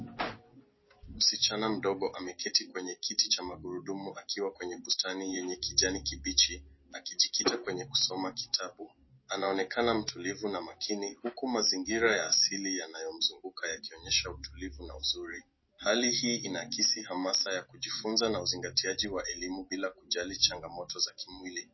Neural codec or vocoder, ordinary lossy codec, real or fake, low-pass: none; MP3, 24 kbps; real; 7.2 kHz